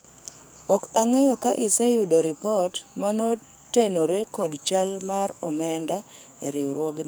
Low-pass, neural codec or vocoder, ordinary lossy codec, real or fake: none; codec, 44.1 kHz, 2.6 kbps, SNAC; none; fake